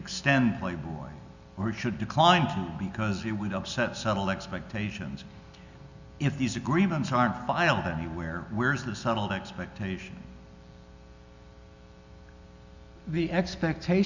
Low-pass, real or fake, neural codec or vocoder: 7.2 kHz; real; none